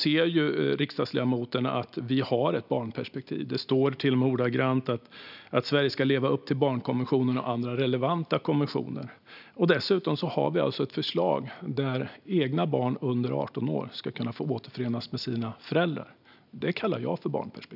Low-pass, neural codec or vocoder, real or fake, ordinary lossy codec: 5.4 kHz; none; real; none